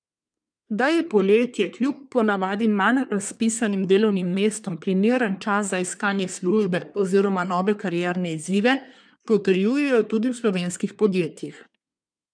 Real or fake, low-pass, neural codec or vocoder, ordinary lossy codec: fake; 9.9 kHz; codec, 24 kHz, 1 kbps, SNAC; none